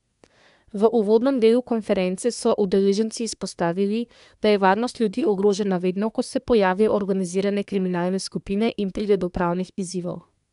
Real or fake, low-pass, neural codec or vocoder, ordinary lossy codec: fake; 10.8 kHz; codec, 24 kHz, 1 kbps, SNAC; none